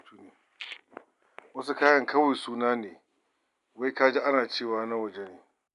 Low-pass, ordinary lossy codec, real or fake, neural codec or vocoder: 10.8 kHz; none; real; none